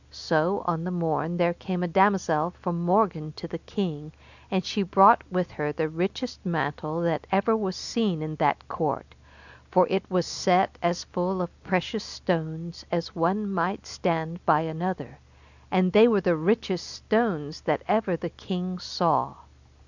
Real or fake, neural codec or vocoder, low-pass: real; none; 7.2 kHz